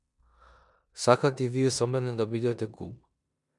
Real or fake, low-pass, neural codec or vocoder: fake; 10.8 kHz; codec, 16 kHz in and 24 kHz out, 0.9 kbps, LongCat-Audio-Codec, four codebook decoder